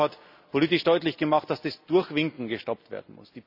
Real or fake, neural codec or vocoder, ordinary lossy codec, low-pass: real; none; none; 5.4 kHz